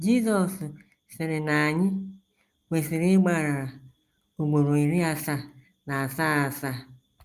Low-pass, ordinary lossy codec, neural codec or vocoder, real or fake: 14.4 kHz; Opus, 32 kbps; none; real